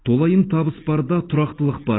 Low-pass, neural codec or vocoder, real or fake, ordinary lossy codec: 7.2 kHz; none; real; AAC, 16 kbps